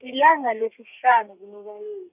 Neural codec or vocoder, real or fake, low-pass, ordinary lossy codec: codec, 32 kHz, 1.9 kbps, SNAC; fake; 3.6 kHz; none